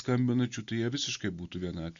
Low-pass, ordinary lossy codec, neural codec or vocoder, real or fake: 7.2 kHz; Opus, 64 kbps; none; real